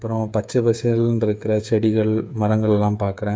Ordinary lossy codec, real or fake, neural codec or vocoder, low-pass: none; fake; codec, 16 kHz, 8 kbps, FreqCodec, smaller model; none